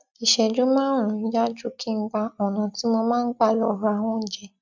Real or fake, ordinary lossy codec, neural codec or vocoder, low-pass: real; none; none; 7.2 kHz